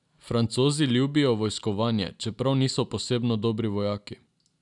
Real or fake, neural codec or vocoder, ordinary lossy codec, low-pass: real; none; none; 10.8 kHz